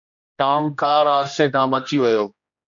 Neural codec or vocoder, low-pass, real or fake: codec, 16 kHz, 1 kbps, X-Codec, HuBERT features, trained on general audio; 7.2 kHz; fake